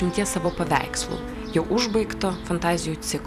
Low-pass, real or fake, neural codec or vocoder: 14.4 kHz; fake; vocoder, 44.1 kHz, 128 mel bands every 256 samples, BigVGAN v2